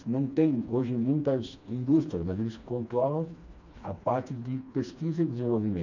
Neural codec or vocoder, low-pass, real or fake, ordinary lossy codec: codec, 16 kHz, 2 kbps, FreqCodec, smaller model; 7.2 kHz; fake; none